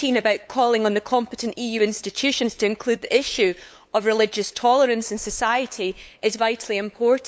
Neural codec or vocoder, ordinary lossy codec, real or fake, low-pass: codec, 16 kHz, 8 kbps, FunCodec, trained on LibriTTS, 25 frames a second; none; fake; none